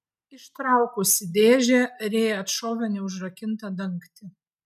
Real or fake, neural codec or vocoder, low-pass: real; none; 14.4 kHz